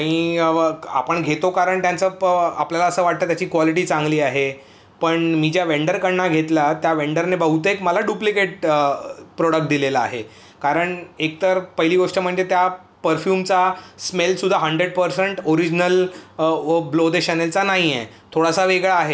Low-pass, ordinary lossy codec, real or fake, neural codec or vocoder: none; none; real; none